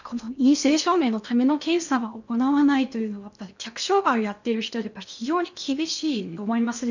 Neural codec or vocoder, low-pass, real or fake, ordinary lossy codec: codec, 16 kHz in and 24 kHz out, 0.8 kbps, FocalCodec, streaming, 65536 codes; 7.2 kHz; fake; none